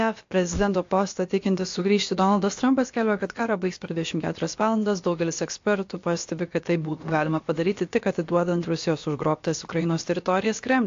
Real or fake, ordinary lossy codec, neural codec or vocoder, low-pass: fake; AAC, 48 kbps; codec, 16 kHz, about 1 kbps, DyCAST, with the encoder's durations; 7.2 kHz